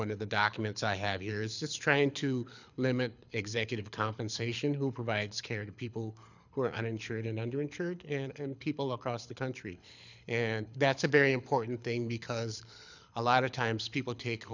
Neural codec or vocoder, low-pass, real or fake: codec, 24 kHz, 6 kbps, HILCodec; 7.2 kHz; fake